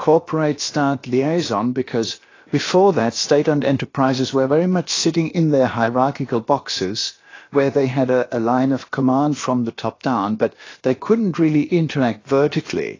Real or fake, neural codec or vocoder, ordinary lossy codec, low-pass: fake; codec, 16 kHz, about 1 kbps, DyCAST, with the encoder's durations; AAC, 32 kbps; 7.2 kHz